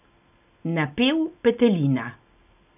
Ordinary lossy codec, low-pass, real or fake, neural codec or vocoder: none; 3.6 kHz; real; none